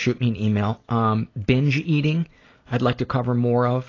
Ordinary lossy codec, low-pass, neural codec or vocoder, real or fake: AAC, 32 kbps; 7.2 kHz; none; real